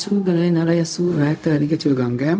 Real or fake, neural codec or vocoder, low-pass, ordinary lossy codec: fake; codec, 16 kHz, 0.4 kbps, LongCat-Audio-Codec; none; none